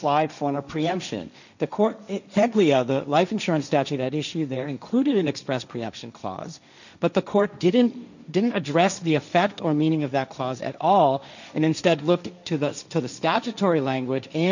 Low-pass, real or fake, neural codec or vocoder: 7.2 kHz; fake; codec, 16 kHz, 1.1 kbps, Voila-Tokenizer